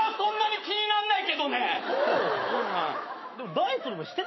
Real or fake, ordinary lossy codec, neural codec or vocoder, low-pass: fake; MP3, 24 kbps; autoencoder, 48 kHz, 128 numbers a frame, DAC-VAE, trained on Japanese speech; 7.2 kHz